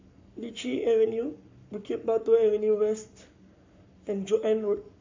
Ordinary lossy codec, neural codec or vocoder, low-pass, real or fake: MP3, 64 kbps; codec, 44.1 kHz, 7.8 kbps, Pupu-Codec; 7.2 kHz; fake